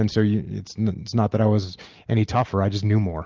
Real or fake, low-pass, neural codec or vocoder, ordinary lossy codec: real; 7.2 kHz; none; Opus, 24 kbps